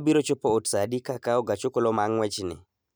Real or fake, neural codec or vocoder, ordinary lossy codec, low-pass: real; none; none; none